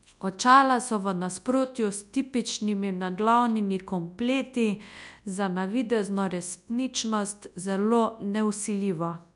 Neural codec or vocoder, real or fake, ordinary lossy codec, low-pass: codec, 24 kHz, 0.9 kbps, WavTokenizer, large speech release; fake; none; 10.8 kHz